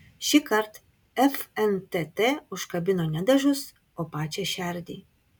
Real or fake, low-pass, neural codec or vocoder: real; 19.8 kHz; none